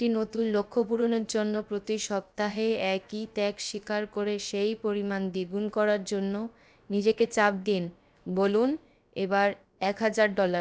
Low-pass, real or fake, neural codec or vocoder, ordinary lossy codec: none; fake; codec, 16 kHz, about 1 kbps, DyCAST, with the encoder's durations; none